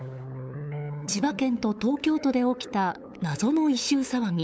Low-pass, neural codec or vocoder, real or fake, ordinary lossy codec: none; codec, 16 kHz, 8 kbps, FunCodec, trained on LibriTTS, 25 frames a second; fake; none